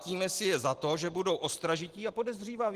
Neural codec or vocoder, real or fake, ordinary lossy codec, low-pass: none; real; Opus, 16 kbps; 14.4 kHz